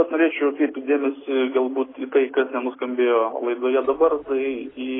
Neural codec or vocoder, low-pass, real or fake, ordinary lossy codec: none; 7.2 kHz; real; AAC, 16 kbps